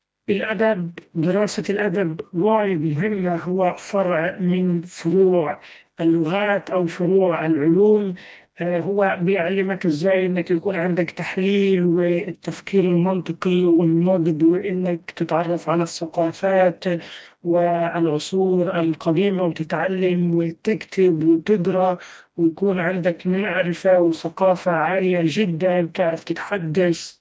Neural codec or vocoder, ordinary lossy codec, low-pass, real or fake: codec, 16 kHz, 1 kbps, FreqCodec, smaller model; none; none; fake